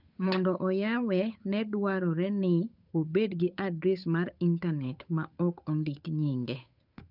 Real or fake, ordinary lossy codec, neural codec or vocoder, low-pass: fake; none; codec, 16 kHz, 8 kbps, FunCodec, trained on Chinese and English, 25 frames a second; 5.4 kHz